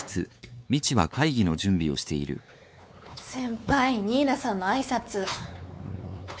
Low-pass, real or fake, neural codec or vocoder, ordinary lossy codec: none; fake; codec, 16 kHz, 4 kbps, X-Codec, WavLM features, trained on Multilingual LibriSpeech; none